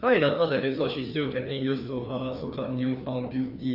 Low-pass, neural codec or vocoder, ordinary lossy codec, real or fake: 5.4 kHz; codec, 16 kHz, 2 kbps, FreqCodec, larger model; none; fake